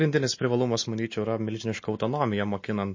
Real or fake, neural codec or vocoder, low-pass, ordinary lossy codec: real; none; 7.2 kHz; MP3, 32 kbps